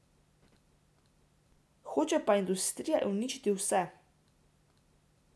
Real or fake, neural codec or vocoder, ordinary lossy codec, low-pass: real; none; none; none